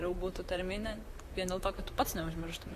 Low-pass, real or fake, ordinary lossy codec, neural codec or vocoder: 14.4 kHz; fake; AAC, 64 kbps; vocoder, 44.1 kHz, 128 mel bands, Pupu-Vocoder